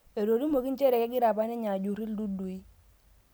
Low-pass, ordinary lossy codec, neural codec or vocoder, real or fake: none; none; none; real